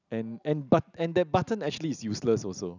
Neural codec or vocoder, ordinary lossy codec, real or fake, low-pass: none; none; real; 7.2 kHz